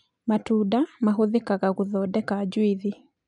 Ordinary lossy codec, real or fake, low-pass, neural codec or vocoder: none; real; 10.8 kHz; none